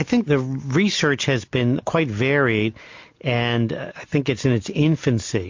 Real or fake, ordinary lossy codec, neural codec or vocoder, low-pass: real; MP3, 48 kbps; none; 7.2 kHz